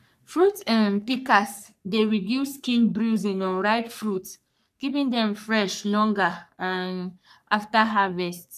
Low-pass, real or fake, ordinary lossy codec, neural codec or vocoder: 14.4 kHz; fake; none; codec, 44.1 kHz, 3.4 kbps, Pupu-Codec